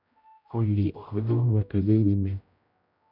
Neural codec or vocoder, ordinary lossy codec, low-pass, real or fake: codec, 16 kHz, 0.5 kbps, X-Codec, HuBERT features, trained on general audio; none; 5.4 kHz; fake